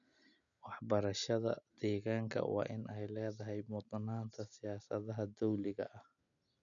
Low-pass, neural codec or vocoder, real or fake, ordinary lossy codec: 7.2 kHz; none; real; none